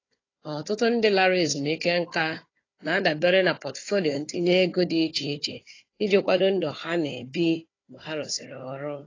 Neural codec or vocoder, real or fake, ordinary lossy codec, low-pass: codec, 16 kHz, 4 kbps, FunCodec, trained on Chinese and English, 50 frames a second; fake; AAC, 32 kbps; 7.2 kHz